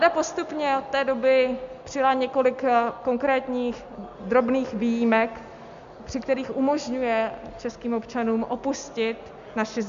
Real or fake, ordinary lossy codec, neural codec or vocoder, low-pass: real; MP3, 64 kbps; none; 7.2 kHz